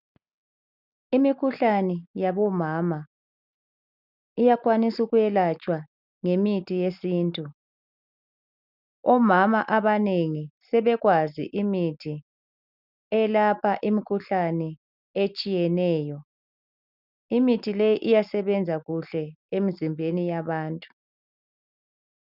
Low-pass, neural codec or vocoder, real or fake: 5.4 kHz; none; real